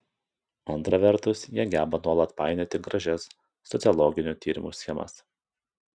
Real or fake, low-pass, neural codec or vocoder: real; 9.9 kHz; none